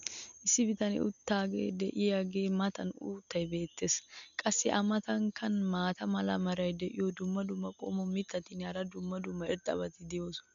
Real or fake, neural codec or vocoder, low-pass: real; none; 7.2 kHz